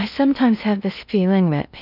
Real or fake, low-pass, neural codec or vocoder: fake; 5.4 kHz; codec, 16 kHz in and 24 kHz out, 0.6 kbps, FocalCodec, streaming, 4096 codes